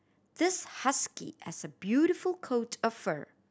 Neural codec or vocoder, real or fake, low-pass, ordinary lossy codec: none; real; none; none